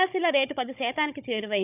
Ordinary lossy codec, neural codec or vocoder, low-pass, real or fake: none; codec, 16 kHz, 16 kbps, FunCodec, trained on Chinese and English, 50 frames a second; 3.6 kHz; fake